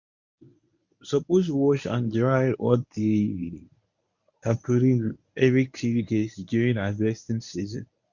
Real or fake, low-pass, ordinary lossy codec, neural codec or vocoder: fake; 7.2 kHz; none; codec, 24 kHz, 0.9 kbps, WavTokenizer, medium speech release version 1